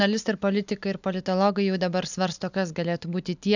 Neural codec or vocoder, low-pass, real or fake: none; 7.2 kHz; real